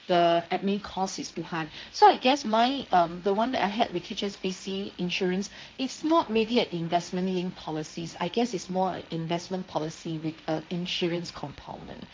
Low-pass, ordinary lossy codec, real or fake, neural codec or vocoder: none; none; fake; codec, 16 kHz, 1.1 kbps, Voila-Tokenizer